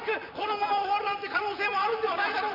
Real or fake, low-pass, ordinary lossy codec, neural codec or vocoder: fake; 5.4 kHz; none; vocoder, 44.1 kHz, 128 mel bands, Pupu-Vocoder